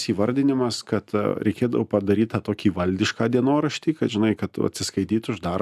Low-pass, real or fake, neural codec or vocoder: 14.4 kHz; real; none